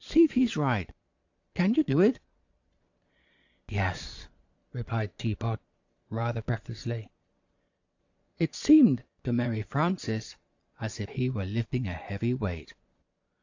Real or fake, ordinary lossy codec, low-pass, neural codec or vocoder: fake; AAC, 48 kbps; 7.2 kHz; vocoder, 22.05 kHz, 80 mel bands, Vocos